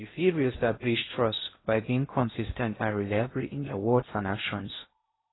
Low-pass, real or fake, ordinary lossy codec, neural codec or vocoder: 7.2 kHz; fake; AAC, 16 kbps; codec, 16 kHz in and 24 kHz out, 0.6 kbps, FocalCodec, streaming, 2048 codes